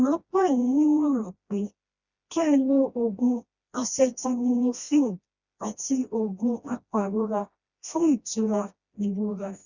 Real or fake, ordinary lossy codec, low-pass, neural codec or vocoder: fake; Opus, 64 kbps; 7.2 kHz; codec, 16 kHz, 1 kbps, FreqCodec, smaller model